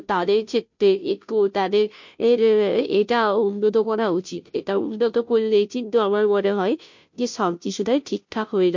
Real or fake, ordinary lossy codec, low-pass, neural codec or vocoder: fake; MP3, 48 kbps; 7.2 kHz; codec, 16 kHz, 0.5 kbps, FunCodec, trained on Chinese and English, 25 frames a second